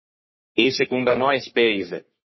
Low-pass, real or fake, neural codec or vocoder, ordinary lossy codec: 7.2 kHz; fake; codec, 44.1 kHz, 3.4 kbps, Pupu-Codec; MP3, 24 kbps